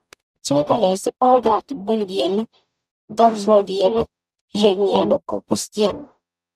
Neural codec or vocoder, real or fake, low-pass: codec, 44.1 kHz, 0.9 kbps, DAC; fake; 14.4 kHz